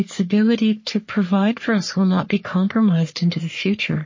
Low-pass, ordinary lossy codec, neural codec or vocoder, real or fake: 7.2 kHz; MP3, 32 kbps; codec, 44.1 kHz, 3.4 kbps, Pupu-Codec; fake